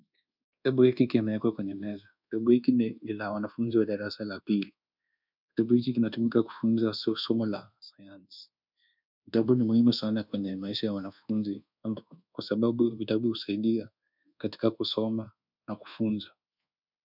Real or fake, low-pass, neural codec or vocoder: fake; 5.4 kHz; codec, 24 kHz, 1.2 kbps, DualCodec